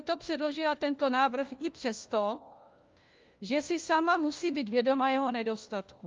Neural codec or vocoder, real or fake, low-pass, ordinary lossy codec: codec, 16 kHz, 1 kbps, FunCodec, trained on LibriTTS, 50 frames a second; fake; 7.2 kHz; Opus, 32 kbps